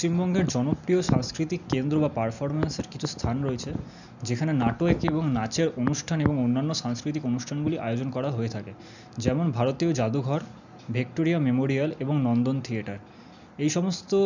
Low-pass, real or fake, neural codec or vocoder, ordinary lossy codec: 7.2 kHz; real; none; none